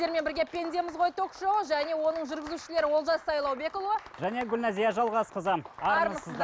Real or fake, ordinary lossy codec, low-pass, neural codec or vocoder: real; none; none; none